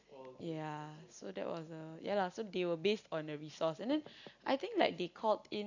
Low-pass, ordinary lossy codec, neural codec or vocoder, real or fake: 7.2 kHz; none; none; real